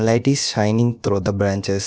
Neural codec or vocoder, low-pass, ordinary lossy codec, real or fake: codec, 16 kHz, about 1 kbps, DyCAST, with the encoder's durations; none; none; fake